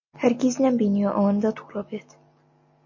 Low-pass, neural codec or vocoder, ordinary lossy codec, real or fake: 7.2 kHz; none; MP3, 32 kbps; real